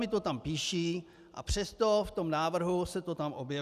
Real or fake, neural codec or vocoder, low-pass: real; none; 14.4 kHz